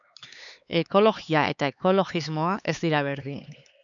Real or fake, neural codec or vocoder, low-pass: fake; codec, 16 kHz, 4 kbps, X-Codec, HuBERT features, trained on LibriSpeech; 7.2 kHz